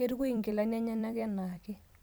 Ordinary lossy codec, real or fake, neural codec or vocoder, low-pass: none; fake; vocoder, 44.1 kHz, 128 mel bands every 256 samples, BigVGAN v2; none